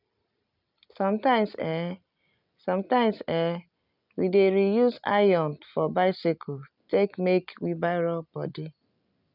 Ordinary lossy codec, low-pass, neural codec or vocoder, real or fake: none; 5.4 kHz; none; real